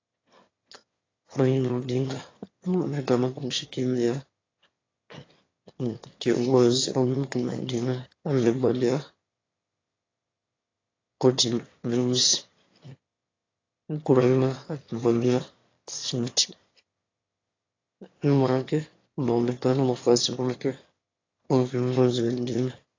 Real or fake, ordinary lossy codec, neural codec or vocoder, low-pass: fake; AAC, 32 kbps; autoencoder, 22.05 kHz, a latent of 192 numbers a frame, VITS, trained on one speaker; 7.2 kHz